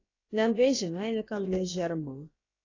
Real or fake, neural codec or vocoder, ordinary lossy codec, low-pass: fake; codec, 16 kHz, about 1 kbps, DyCAST, with the encoder's durations; AAC, 32 kbps; 7.2 kHz